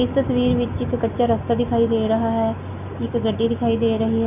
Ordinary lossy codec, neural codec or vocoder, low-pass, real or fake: none; none; 3.6 kHz; real